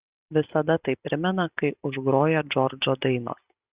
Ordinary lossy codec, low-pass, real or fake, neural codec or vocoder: Opus, 64 kbps; 3.6 kHz; real; none